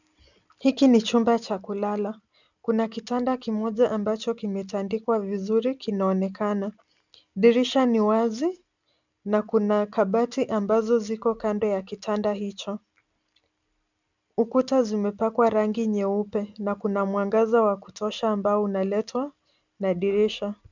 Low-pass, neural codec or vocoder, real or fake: 7.2 kHz; none; real